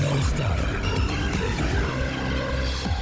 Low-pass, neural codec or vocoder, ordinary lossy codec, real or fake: none; codec, 16 kHz, 16 kbps, FunCodec, trained on Chinese and English, 50 frames a second; none; fake